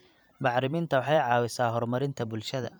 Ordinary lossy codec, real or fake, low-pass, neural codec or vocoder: none; fake; none; vocoder, 44.1 kHz, 128 mel bands every 512 samples, BigVGAN v2